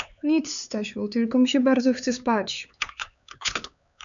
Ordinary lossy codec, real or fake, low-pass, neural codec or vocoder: MP3, 96 kbps; fake; 7.2 kHz; codec, 16 kHz, 4 kbps, X-Codec, HuBERT features, trained on LibriSpeech